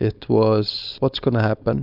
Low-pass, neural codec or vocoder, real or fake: 5.4 kHz; codec, 16 kHz, 4.8 kbps, FACodec; fake